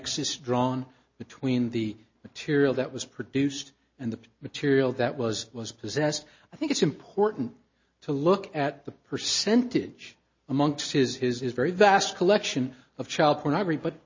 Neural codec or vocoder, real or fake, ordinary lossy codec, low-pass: none; real; MP3, 32 kbps; 7.2 kHz